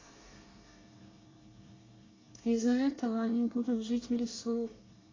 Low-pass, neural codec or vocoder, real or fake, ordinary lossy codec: 7.2 kHz; codec, 24 kHz, 1 kbps, SNAC; fake; AAC, 32 kbps